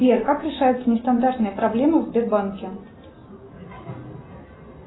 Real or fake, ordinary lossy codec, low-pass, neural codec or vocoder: real; AAC, 16 kbps; 7.2 kHz; none